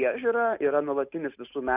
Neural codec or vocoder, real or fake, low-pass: none; real; 3.6 kHz